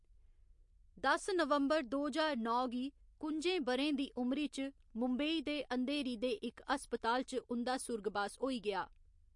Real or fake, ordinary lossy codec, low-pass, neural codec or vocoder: real; MP3, 48 kbps; 10.8 kHz; none